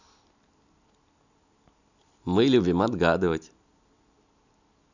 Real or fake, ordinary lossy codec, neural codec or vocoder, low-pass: real; none; none; 7.2 kHz